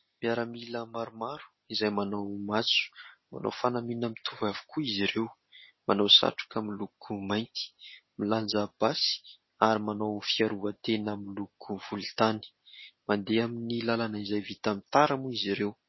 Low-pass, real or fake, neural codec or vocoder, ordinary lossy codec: 7.2 kHz; real; none; MP3, 24 kbps